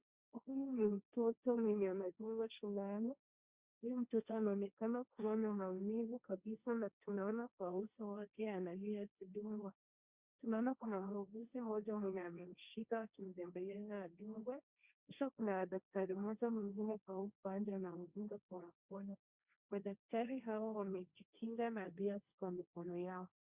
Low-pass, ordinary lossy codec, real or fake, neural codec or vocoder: 3.6 kHz; Opus, 64 kbps; fake; codec, 16 kHz, 1.1 kbps, Voila-Tokenizer